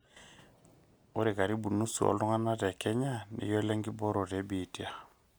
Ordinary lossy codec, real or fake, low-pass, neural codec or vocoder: none; real; none; none